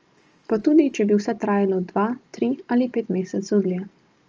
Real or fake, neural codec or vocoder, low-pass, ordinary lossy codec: real; none; 7.2 kHz; Opus, 24 kbps